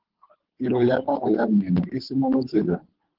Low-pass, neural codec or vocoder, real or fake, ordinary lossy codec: 5.4 kHz; codec, 24 kHz, 3 kbps, HILCodec; fake; Opus, 16 kbps